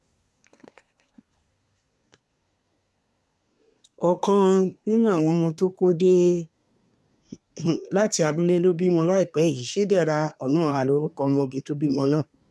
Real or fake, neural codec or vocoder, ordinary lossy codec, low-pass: fake; codec, 24 kHz, 1 kbps, SNAC; none; none